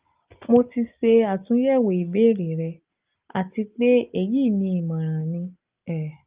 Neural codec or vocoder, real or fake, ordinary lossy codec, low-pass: none; real; Opus, 24 kbps; 3.6 kHz